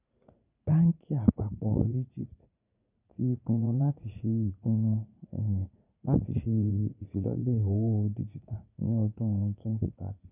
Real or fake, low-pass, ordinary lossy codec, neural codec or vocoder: fake; 3.6 kHz; none; vocoder, 44.1 kHz, 80 mel bands, Vocos